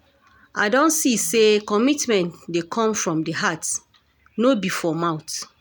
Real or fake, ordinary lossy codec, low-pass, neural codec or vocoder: real; none; none; none